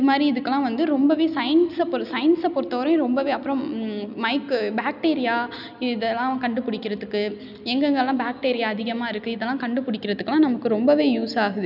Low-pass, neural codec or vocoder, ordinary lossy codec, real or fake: 5.4 kHz; none; none; real